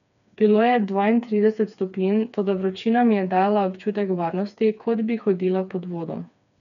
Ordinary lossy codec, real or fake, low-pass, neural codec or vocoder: none; fake; 7.2 kHz; codec, 16 kHz, 4 kbps, FreqCodec, smaller model